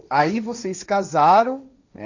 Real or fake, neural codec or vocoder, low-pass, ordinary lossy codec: fake; codec, 16 kHz, 1.1 kbps, Voila-Tokenizer; 7.2 kHz; none